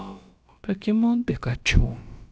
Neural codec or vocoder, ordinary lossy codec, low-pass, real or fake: codec, 16 kHz, about 1 kbps, DyCAST, with the encoder's durations; none; none; fake